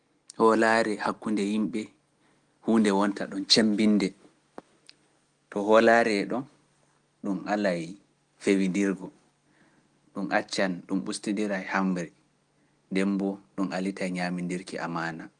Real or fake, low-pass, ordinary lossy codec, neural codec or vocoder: real; 9.9 kHz; Opus, 24 kbps; none